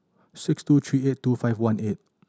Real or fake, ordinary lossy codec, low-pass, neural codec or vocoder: real; none; none; none